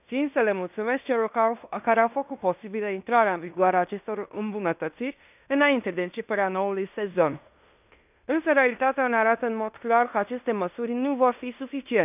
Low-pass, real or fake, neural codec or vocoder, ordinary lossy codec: 3.6 kHz; fake; codec, 16 kHz in and 24 kHz out, 0.9 kbps, LongCat-Audio-Codec, fine tuned four codebook decoder; none